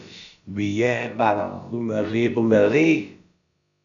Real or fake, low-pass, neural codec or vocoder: fake; 7.2 kHz; codec, 16 kHz, about 1 kbps, DyCAST, with the encoder's durations